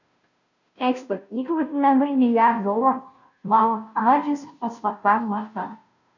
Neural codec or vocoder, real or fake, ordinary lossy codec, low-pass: codec, 16 kHz, 0.5 kbps, FunCodec, trained on Chinese and English, 25 frames a second; fake; none; 7.2 kHz